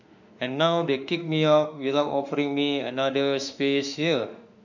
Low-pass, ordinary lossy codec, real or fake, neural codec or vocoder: 7.2 kHz; none; fake; autoencoder, 48 kHz, 32 numbers a frame, DAC-VAE, trained on Japanese speech